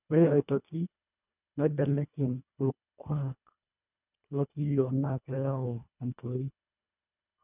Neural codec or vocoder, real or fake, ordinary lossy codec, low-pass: codec, 24 kHz, 1.5 kbps, HILCodec; fake; none; 3.6 kHz